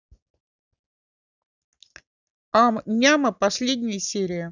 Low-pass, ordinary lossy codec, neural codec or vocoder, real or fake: 7.2 kHz; none; none; real